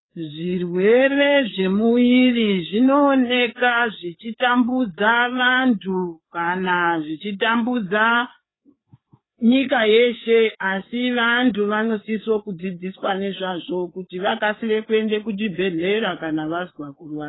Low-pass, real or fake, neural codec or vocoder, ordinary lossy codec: 7.2 kHz; fake; codec, 16 kHz, 4 kbps, FreqCodec, larger model; AAC, 16 kbps